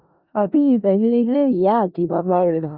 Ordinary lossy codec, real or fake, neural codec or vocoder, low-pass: Opus, 64 kbps; fake; codec, 16 kHz in and 24 kHz out, 0.4 kbps, LongCat-Audio-Codec, four codebook decoder; 5.4 kHz